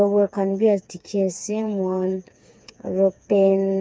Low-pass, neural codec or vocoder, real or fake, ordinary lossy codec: none; codec, 16 kHz, 4 kbps, FreqCodec, smaller model; fake; none